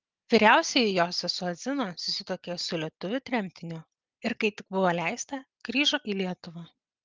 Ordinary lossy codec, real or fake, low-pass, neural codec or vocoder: Opus, 24 kbps; real; 7.2 kHz; none